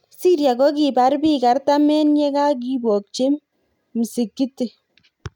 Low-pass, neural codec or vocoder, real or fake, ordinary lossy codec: 19.8 kHz; none; real; none